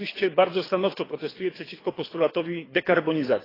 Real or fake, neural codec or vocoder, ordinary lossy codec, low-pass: fake; codec, 24 kHz, 6 kbps, HILCodec; AAC, 24 kbps; 5.4 kHz